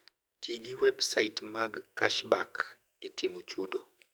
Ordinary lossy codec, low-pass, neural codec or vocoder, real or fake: none; none; codec, 44.1 kHz, 2.6 kbps, SNAC; fake